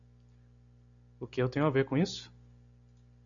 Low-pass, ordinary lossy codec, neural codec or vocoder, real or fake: 7.2 kHz; MP3, 96 kbps; none; real